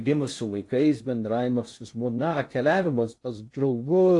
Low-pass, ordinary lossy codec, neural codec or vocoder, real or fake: 10.8 kHz; AAC, 48 kbps; codec, 16 kHz in and 24 kHz out, 0.6 kbps, FocalCodec, streaming, 4096 codes; fake